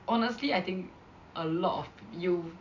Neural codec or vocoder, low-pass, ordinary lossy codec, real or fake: none; 7.2 kHz; none; real